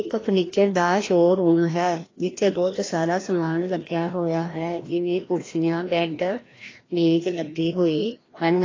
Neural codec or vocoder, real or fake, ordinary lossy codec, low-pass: codec, 16 kHz, 1 kbps, FreqCodec, larger model; fake; AAC, 32 kbps; 7.2 kHz